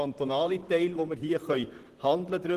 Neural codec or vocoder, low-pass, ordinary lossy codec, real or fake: vocoder, 44.1 kHz, 128 mel bands, Pupu-Vocoder; 14.4 kHz; Opus, 16 kbps; fake